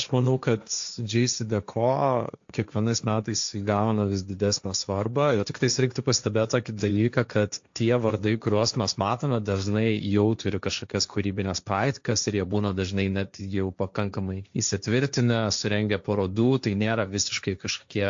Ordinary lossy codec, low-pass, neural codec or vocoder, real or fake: AAC, 64 kbps; 7.2 kHz; codec, 16 kHz, 1.1 kbps, Voila-Tokenizer; fake